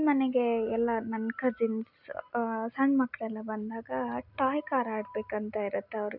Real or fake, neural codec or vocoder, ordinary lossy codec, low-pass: real; none; none; 5.4 kHz